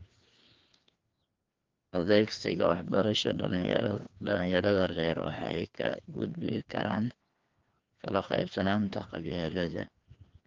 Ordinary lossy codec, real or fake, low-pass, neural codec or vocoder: Opus, 32 kbps; fake; 7.2 kHz; codec, 16 kHz, 2 kbps, FreqCodec, larger model